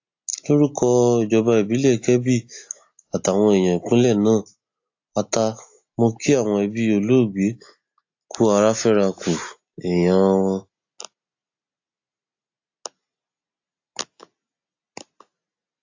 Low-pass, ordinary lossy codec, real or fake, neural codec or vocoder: 7.2 kHz; AAC, 48 kbps; real; none